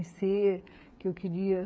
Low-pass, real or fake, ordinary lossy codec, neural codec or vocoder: none; fake; none; codec, 16 kHz, 8 kbps, FreqCodec, smaller model